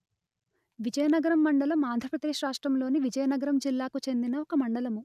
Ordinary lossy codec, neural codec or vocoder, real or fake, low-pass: none; none; real; 14.4 kHz